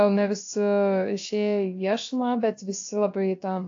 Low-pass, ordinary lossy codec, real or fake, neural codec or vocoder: 7.2 kHz; AAC, 48 kbps; fake; codec, 16 kHz, about 1 kbps, DyCAST, with the encoder's durations